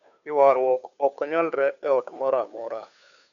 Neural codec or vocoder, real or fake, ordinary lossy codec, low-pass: codec, 16 kHz, 2 kbps, FunCodec, trained on Chinese and English, 25 frames a second; fake; none; 7.2 kHz